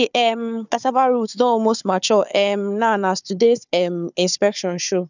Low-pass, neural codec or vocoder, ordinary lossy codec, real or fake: 7.2 kHz; codec, 16 kHz, 4 kbps, FunCodec, trained on Chinese and English, 50 frames a second; none; fake